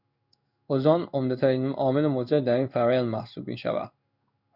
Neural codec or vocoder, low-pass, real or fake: codec, 16 kHz in and 24 kHz out, 1 kbps, XY-Tokenizer; 5.4 kHz; fake